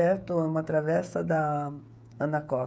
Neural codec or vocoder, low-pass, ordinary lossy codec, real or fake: codec, 16 kHz, 16 kbps, FreqCodec, smaller model; none; none; fake